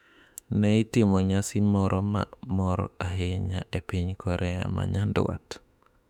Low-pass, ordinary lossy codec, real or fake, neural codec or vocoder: 19.8 kHz; none; fake; autoencoder, 48 kHz, 32 numbers a frame, DAC-VAE, trained on Japanese speech